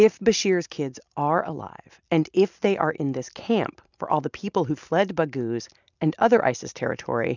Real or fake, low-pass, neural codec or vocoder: real; 7.2 kHz; none